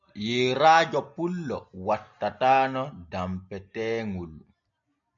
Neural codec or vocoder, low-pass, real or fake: none; 7.2 kHz; real